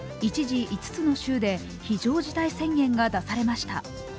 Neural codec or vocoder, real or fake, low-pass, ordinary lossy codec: none; real; none; none